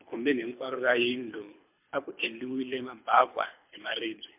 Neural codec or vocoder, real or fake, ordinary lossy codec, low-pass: codec, 24 kHz, 3 kbps, HILCodec; fake; MP3, 32 kbps; 3.6 kHz